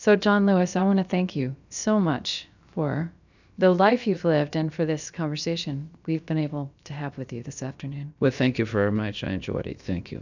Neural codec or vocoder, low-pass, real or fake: codec, 16 kHz, about 1 kbps, DyCAST, with the encoder's durations; 7.2 kHz; fake